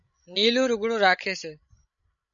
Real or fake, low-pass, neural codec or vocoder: fake; 7.2 kHz; codec, 16 kHz, 16 kbps, FreqCodec, larger model